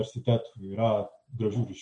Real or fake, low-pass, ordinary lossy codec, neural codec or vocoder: real; 9.9 kHz; MP3, 96 kbps; none